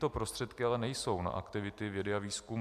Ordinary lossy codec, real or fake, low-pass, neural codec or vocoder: AAC, 96 kbps; real; 14.4 kHz; none